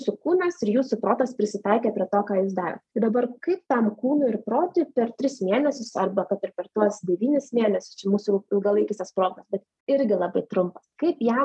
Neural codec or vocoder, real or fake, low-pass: none; real; 10.8 kHz